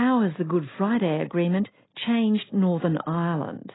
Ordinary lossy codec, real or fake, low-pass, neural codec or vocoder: AAC, 16 kbps; real; 7.2 kHz; none